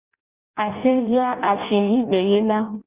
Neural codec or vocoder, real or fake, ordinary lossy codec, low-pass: codec, 16 kHz in and 24 kHz out, 0.6 kbps, FireRedTTS-2 codec; fake; Opus, 64 kbps; 3.6 kHz